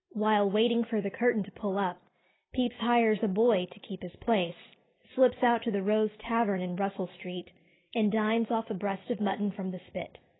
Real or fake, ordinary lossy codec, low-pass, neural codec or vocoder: real; AAC, 16 kbps; 7.2 kHz; none